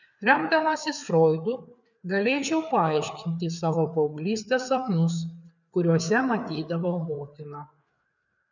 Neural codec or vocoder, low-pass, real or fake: codec, 16 kHz, 4 kbps, FreqCodec, larger model; 7.2 kHz; fake